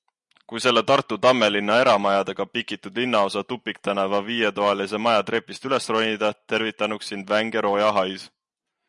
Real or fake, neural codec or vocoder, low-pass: real; none; 10.8 kHz